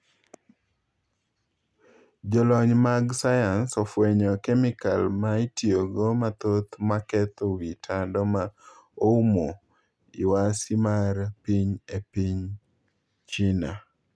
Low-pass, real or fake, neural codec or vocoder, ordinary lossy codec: none; real; none; none